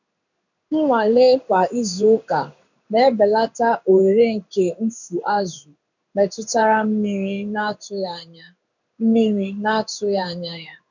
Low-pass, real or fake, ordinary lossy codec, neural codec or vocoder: 7.2 kHz; fake; none; codec, 16 kHz in and 24 kHz out, 1 kbps, XY-Tokenizer